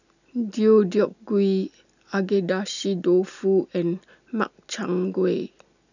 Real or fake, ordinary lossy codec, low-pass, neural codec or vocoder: real; none; 7.2 kHz; none